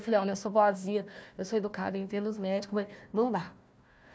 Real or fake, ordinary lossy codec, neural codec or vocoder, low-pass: fake; none; codec, 16 kHz, 1 kbps, FunCodec, trained on Chinese and English, 50 frames a second; none